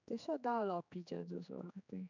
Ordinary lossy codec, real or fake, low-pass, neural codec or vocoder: none; fake; 7.2 kHz; codec, 16 kHz, 4 kbps, X-Codec, HuBERT features, trained on general audio